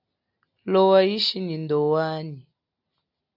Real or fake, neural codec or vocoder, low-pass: real; none; 5.4 kHz